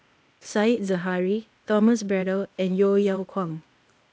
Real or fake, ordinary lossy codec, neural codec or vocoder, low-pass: fake; none; codec, 16 kHz, 0.8 kbps, ZipCodec; none